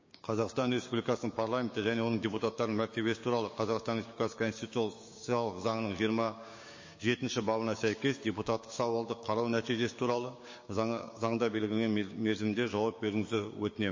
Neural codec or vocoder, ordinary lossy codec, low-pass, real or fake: codec, 16 kHz, 6 kbps, DAC; MP3, 32 kbps; 7.2 kHz; fake